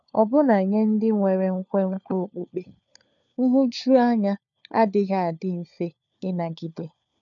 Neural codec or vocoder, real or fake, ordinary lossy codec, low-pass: codec, 16 kHz, 4 kbps, FunCodec, trained on LibriTTS, 50 frames a second; fake; none; 7.2 kHz